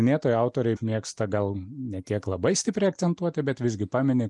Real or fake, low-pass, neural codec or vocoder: real; 10.8 kHz; none